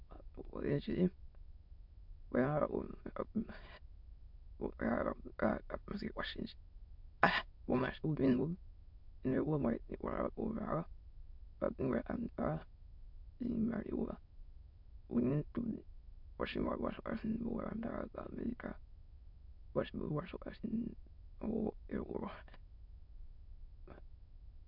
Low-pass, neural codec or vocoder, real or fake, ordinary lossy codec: 5.4 kHz; autoencoder, 22.05 kHz, a latent of 192 numbers a frame, VITS, trained on many speakers; fake; MP3, 48 kbps